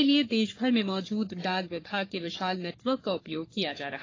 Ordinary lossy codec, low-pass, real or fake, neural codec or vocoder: AAC, 32 kbps; 7.2 kHz; fake; codec, 44.1 kHz, 3.4 kbps, Pupu-Codec